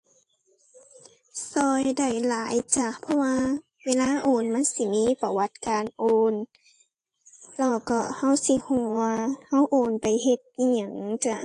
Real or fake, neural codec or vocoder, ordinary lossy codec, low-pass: fake; vocoder, 24 kHz, 100 mel bands, Vocos; AAC, 48 kbps; 10.8 kHz